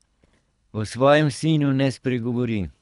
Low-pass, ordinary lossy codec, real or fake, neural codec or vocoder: 10.8 kHz; none; fake; codec, 24 kHz, 3 kbps, HILCodec